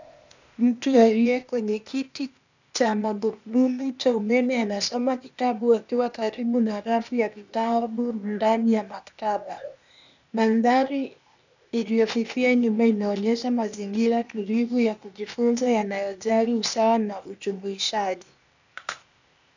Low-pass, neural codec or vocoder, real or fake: 7.2 kHz; codec, 16 kHz, 0.8 kbps, ZipCodec; fake